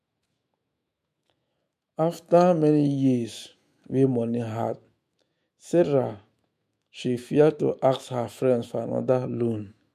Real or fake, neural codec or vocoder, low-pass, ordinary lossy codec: fake; autoencoder, 48 kHz, 128 numbers a frame, DAC-VAE, trained on Japanese speech; 14.4 kHz; MP3, 64 kbps